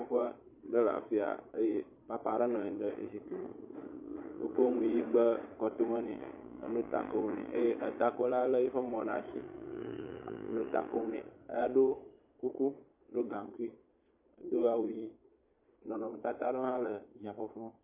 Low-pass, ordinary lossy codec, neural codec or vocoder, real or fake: 3.6 kHz; MP3, 24 kbps; vocoder, 22.05 kHz, 80 mel bands, Vocos; fake